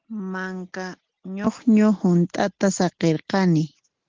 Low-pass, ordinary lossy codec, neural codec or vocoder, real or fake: 7.2 kHz; Opus, 16 kbps; none; real